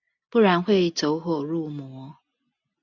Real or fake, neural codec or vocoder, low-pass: real; none; 7.2 kHz